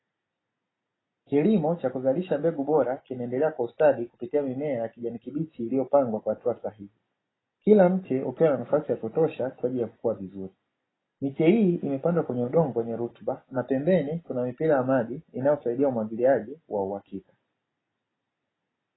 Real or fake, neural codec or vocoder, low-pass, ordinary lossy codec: real; none; 7.2 kHz; AAC, 16 kbps